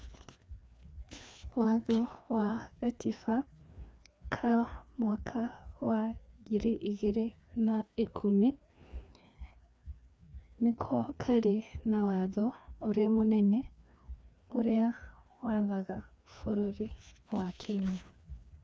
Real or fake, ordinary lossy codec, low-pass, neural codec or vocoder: fake; none; none; codec, 16 kHz, 2 kbps, FreqCodec, larger model